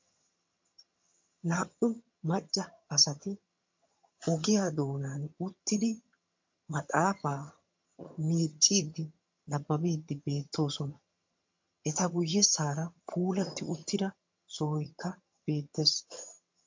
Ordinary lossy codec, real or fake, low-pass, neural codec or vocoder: MP3, 48 kbps; fake; 7.2 kHz; vocoder, 22.05 kHz, 80 mel bands, HiFi-GAN